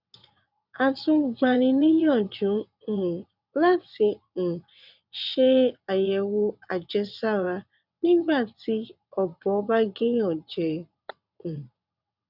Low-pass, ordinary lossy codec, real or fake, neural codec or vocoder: 5.4 kHz; none; fake; vocoder, 22.05 kHz, 80 mel bands, WaveNeXt